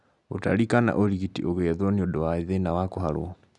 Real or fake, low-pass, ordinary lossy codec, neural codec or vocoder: real; 10.8 kHz; none; none